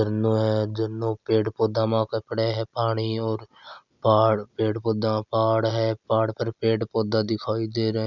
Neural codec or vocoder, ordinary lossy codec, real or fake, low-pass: none; none; real; 7.2 kHz